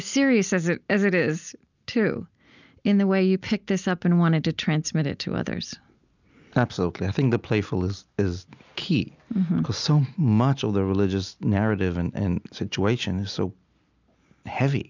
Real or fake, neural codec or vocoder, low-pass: real; none; 7.2 kHz